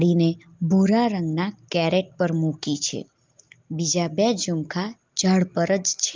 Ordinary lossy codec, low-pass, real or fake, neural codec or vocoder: Opus, 24 kbps; 7.2 kHz; real; none